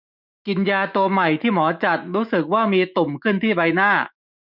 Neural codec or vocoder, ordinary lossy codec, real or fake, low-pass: autoencoder, 48 kHz, 128 numbers a frame, DAC-VAE, trained on Japanese speech; none; fake; 5.4 kHz